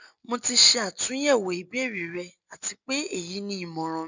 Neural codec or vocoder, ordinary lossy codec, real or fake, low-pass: none; none; real; 7.2 kHz